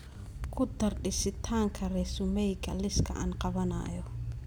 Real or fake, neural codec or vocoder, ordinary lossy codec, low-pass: real; none; none; none